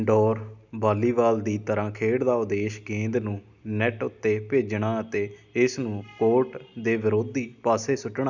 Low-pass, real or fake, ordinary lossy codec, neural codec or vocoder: 7.2 kHz; real; none; none